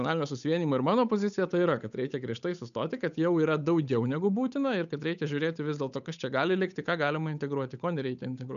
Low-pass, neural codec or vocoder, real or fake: 7.2 kHz; codec, 16 kHz, 8 kbps, FunCodec, trained on Chinese and English, 25 frames a second; fake